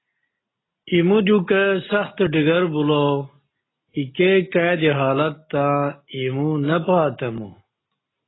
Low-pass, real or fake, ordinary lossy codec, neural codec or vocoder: 7.2 kHz; real; AAC, 16 kbps; none